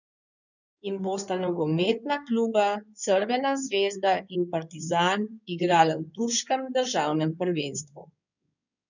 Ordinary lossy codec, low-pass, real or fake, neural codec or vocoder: none; 7.2 kHz; fake; codec, 16 kHz in and 24 kHz out, 2.2 kbps, FireRedTTS-2 codec